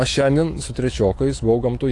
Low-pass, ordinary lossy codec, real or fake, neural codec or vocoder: 10.8 kHz; AAC, 48 kbps; fake; autoencoder, 48 kHz, 128 numbers a frame, DAC-VAE, trained on Japanese speech